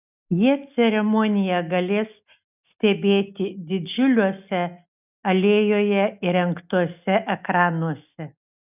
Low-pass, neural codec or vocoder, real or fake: 3.6 kHz; none; real